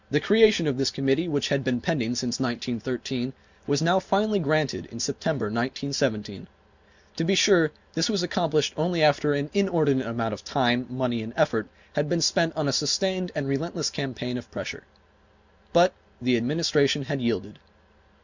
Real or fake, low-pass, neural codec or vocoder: real; 7.2 kHz; none